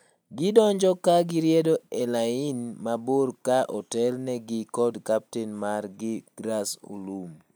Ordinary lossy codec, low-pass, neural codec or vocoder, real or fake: none; none; none; real